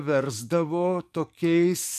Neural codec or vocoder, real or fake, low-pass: codec, 44.1 kHz, 7.8 kbps, DAC; fake; 14.4 kHz